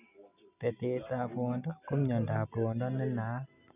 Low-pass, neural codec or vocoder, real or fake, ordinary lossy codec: 3.6 kHz; none; real; none